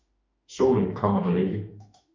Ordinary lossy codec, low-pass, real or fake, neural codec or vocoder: MP3, 48 kbps; 7.2 kHz; fake; autoencoder, 48 kHz, 32 numbers a frame, DAC-VAE, trained on Japanese speech